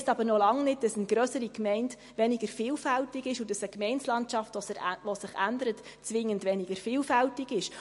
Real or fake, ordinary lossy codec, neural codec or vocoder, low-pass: real; MP3, 48 kbps; none; 14.4 kHz